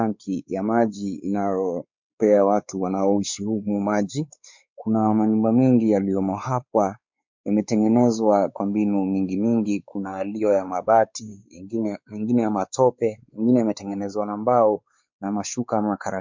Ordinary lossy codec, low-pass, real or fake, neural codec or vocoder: MP3, 64 kbps; 7.2 kHz; fake; codec, 16 kHz, 4 kbps, X-Codec, WavLM features, trained on Multilingual LibriSpeech